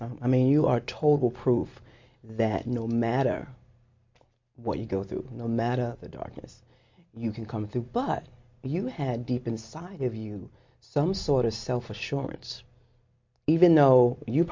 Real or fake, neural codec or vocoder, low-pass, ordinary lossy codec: real; none; 7.2 kHz; MP3, 48 kbps